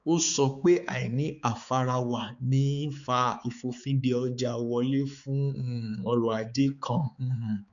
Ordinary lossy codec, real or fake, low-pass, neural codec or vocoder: none; fake; 7.2 kHz; codec, 16 kHz, 4 kbps, X-Codec, HuBERT features, trained on balanced general audio